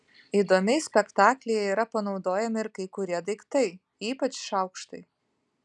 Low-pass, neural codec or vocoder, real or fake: 10.8 kHz; none; real